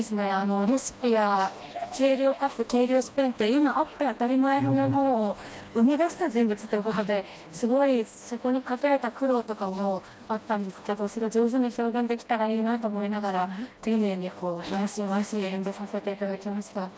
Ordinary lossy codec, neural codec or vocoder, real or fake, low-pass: none; codec, 16 kHz, 1 kbps, FreqCodec, smaller model; fake; none